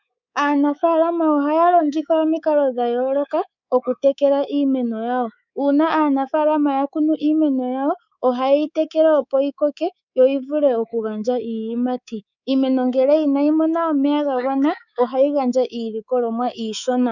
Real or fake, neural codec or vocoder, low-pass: fake; codec, 24 kHz, 3.1 kbps, DualCodec; 7.2 kHz